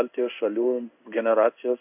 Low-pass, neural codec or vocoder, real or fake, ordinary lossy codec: 3.6 kHz; codec, 16 kHz in and 24 kHz out, 1 kbps, XY-Tokenizer; fake; AAC, 32 kbps